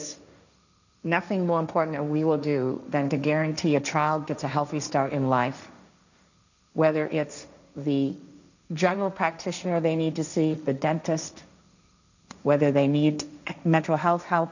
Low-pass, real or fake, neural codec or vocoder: 7.2 kHz; fake; codec, 16 kHz, 1.1 kbps, Voila-Tokenizer